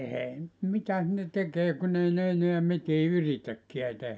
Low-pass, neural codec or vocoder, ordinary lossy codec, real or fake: none; none; none; real